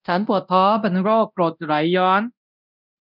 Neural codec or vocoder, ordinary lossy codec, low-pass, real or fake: codec, 24 kHz, 0.9 kbps, DualCodec; none; 5.4 kHz; fake